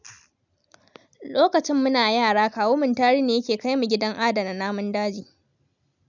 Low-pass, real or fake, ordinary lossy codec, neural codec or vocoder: 7.2 kHz; real; none; none